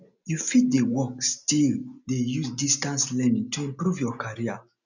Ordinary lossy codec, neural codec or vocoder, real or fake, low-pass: none; none; real; 7.2 kHz